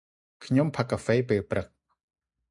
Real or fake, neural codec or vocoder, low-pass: real; none; 10.8 kHz